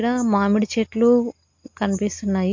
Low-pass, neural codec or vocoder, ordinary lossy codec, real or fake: 7.2 kHz; none; MP3, 48 kbps; real